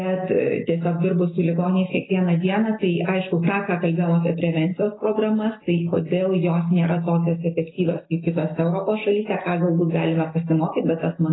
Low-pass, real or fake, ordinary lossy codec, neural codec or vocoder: 7.2 kHz; real; AAC, 16 kbps; none